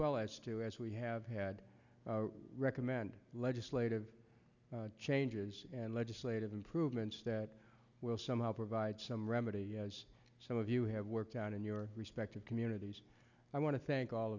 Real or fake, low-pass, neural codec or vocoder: real; 7.2 kHz; none